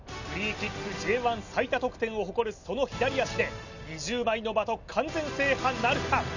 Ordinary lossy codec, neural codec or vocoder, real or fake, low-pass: none; none; real; 7.2 kHz